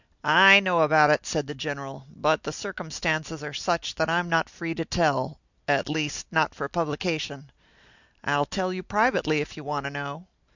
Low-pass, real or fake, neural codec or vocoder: 7.2 kHz; real; none